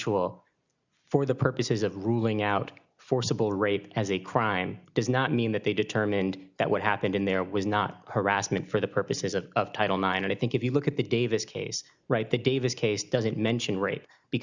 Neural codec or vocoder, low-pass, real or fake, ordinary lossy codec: none; 7.2 kHz; real; Opus, 64 kbps